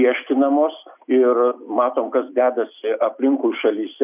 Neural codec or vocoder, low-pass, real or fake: none; 3.6 kHz; real